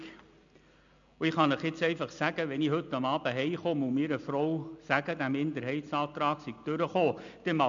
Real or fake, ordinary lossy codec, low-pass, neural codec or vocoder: real; MP3, 64 kbps; 7.2 kHz; none